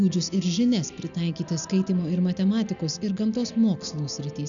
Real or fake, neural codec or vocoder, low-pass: real; none; 7.2 kHz